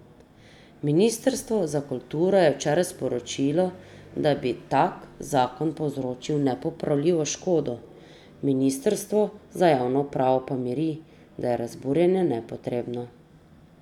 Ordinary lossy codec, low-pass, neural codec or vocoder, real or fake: none; 19.8 kHz; none; real